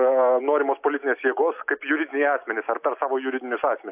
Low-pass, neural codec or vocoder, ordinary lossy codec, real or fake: 3.6 kHz; none; AAC, 32 kbps; real